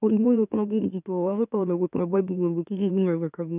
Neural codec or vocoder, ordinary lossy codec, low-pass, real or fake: autoencoder, 44.1 kHz, a latent of 192 numbers a frame, MeloTTS; none; 3.6 kHz; fake